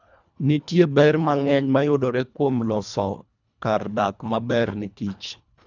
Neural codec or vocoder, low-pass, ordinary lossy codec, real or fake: codec, 24 kHz, 1.5 kbps, HILCodec; 7.2 kHz; none; fake